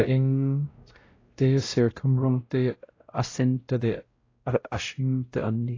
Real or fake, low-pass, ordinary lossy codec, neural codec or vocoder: fake; 7.2 kHz; AAC, 32 kbps; codec, 16 kHz, 0.5 kbps, X-Codec, WavLM features, trained on Multilingual LibriSpeech